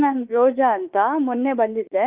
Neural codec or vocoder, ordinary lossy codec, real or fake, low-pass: autoencoder, 48 kHz, 32 numbers a frame, DAC-VAE, trained on Japanese speech; Opus, 32 kbps; fake; 3.6 kHz